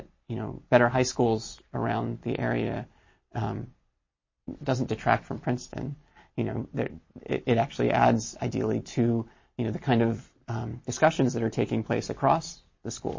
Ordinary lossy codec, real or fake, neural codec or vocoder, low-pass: MP3, 32 kbps; real; none; 7.2 kHz